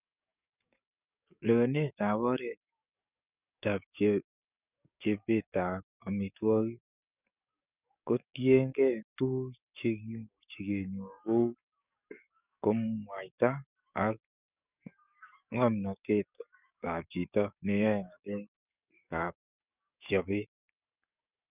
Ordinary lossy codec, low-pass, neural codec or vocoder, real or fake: none; 3.6 kHz; codec, 44.1 kHz, 7.8 kbps, DAC; fake